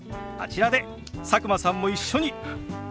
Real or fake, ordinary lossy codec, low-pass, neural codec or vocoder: real; none; none; none